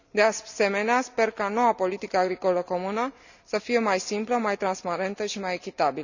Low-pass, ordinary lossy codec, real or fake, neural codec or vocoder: 7.2 kHz; none; real; none